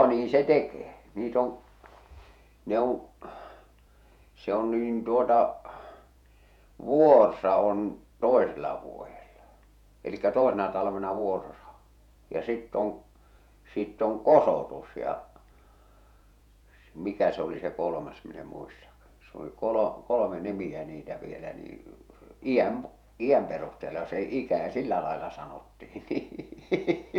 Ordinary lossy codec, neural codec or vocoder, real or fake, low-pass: none; vocoder, 48 kHz, 128 mel bands, Vocos; fake; 19.8 kHz